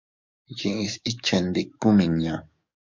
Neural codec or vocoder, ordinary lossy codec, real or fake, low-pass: codec, 44.1 kHz, 7.8 kbps, DAC; MP3, 64 kbps; fake; 7.2 kHz